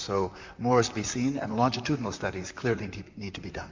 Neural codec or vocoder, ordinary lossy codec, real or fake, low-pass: vocoder, 44.1 kHz, 128 mel bands, Pupu-Vocoder; MP3, 48 kbps; fake; 7.2 kHz